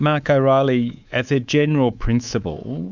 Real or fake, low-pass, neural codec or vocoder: fake; 7.2 kHz; codec, 24 kHz, 3.1 kbps, DualCodec